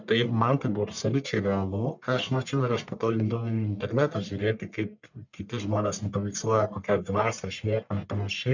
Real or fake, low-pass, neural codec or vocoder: fake; 7.2 kHz; codec, 44.1 kHz, 1.7 kbps, Pupu-Codec